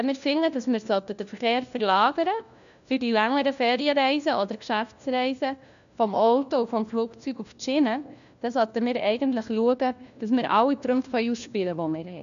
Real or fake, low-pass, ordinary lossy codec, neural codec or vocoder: fake; 7.2 kHz; none; codec, 16 kHz, 1 kbps, FunCodec, trained on LibriTTS, 50 frames a second